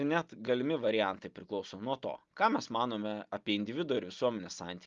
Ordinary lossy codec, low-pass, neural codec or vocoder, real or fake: Opus, 32 kbps; 7.2 kHz; none; real